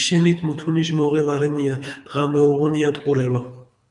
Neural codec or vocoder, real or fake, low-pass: codec, 24 kHz, 3 kbps, HILCodec; fake; 10.8 kHz